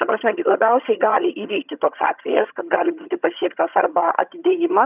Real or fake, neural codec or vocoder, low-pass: fake; vocoder, 22.05 kHz, 80 mel bands, HiFi-GAN; 3.6 kHz